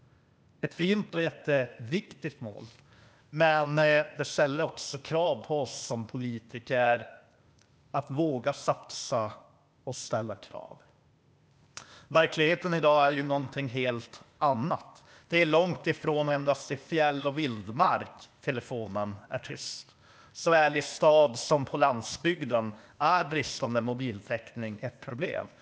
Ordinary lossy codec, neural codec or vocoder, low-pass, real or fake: none; codec, 16 kHz, 0.8 kbps, ZipCodec; none; fake